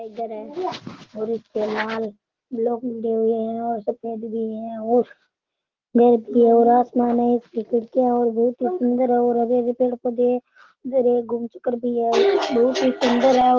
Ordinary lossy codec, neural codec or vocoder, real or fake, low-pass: Opus, 16 kbps; none; real; 7.2 kHz